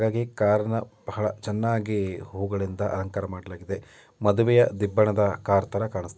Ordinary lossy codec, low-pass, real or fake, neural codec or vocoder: none; none; real; none